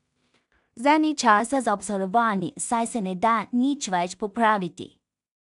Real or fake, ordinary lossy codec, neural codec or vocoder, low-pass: fake; none; codec, 16 kHz in and 24 kHz out, 0.4 kbps, LongCat-Audio-Codec, two codebook decoder; 10.8 kHz